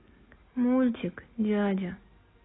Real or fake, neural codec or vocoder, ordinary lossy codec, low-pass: real; none; AAC, 16 kbps; 7.2 kHz